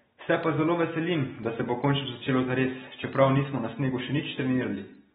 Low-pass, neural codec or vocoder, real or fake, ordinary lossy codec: 14.4 kHz; none; real; AAC, 16 kbps